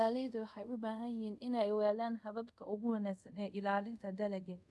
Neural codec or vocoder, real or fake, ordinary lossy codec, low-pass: codec, 24 kHz, 0.5 kbps, DualCodec; fake; none; none